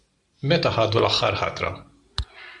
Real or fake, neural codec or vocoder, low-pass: real; none; 10.8 kHz